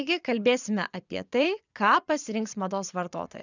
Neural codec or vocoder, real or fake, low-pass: vocoder, 22.05 kHz, 80 mel bands, Vocos; fake; 7.2 kHz